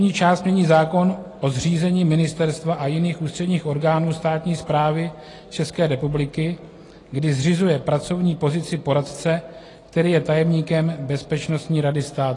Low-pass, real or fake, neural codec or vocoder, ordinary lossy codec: 10.8 kHz; real; none; AAC, 32 kbps